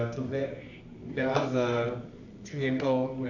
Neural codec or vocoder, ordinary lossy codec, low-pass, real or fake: codec, 24 kHz, 0.9 kbps, WavTokenizer, medium music audio release; none; 7.2 kHz; fake